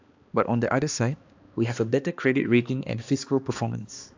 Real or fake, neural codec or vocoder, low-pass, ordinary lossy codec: fake; codec, 16 kHz, 2 kbps, X-Codec, HuBERT features, trained on balanced general audio; 7.2 kHz; AAC, 48 kbps